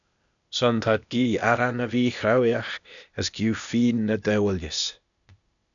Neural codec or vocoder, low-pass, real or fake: codec, 16 kHz, 0.8 kbps, ZipCodec; 7.2 kHz; fake